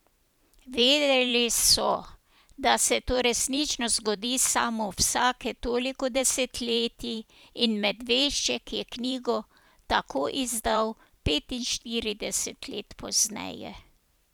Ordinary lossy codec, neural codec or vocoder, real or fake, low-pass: none; vocoder, 44.1 kHz, 128 mel bands every 512 samples, BigVGAN v2; fake; none